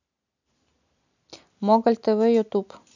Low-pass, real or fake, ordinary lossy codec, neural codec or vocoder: 7.2 kHz; real; none; none